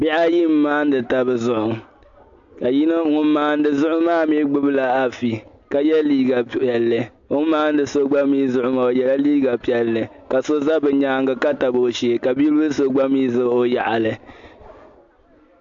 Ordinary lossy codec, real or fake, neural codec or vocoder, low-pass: AAC, 64 kbps; real; none; 7.2 kHz